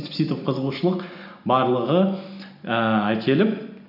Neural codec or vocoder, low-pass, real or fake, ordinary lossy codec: none; 5.4 kHz; real; none